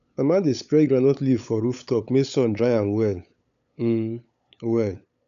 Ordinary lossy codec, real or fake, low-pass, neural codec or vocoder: none; fake; 7.2 kHz; codec, 16 kHz, 8 kbps, FunCodec, trained on LibriTTS, 25 frames a second